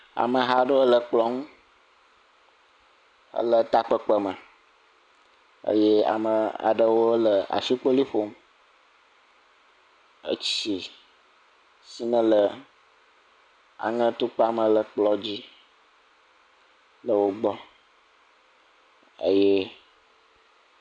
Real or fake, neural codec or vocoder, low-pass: real; none; 9.9 kHz